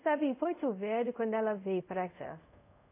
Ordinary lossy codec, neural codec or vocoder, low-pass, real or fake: AAC, 24 kbps; codec, 24 kHz, 0.5 kbps, DualCodec; 3.6 kHz; fake